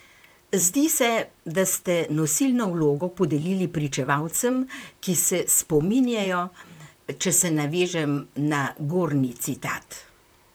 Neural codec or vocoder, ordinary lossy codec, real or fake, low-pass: vocoder, 44.1 kHz, 128 mel bands, Pupu-Vocoder; none; fake; none